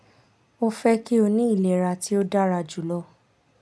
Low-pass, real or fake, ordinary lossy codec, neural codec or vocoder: none; real; none; none